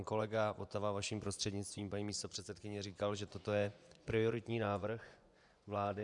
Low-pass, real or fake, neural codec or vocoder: 10.8 kHz; fake; vocoder, 24 kHz, 100 mel bands, Vocos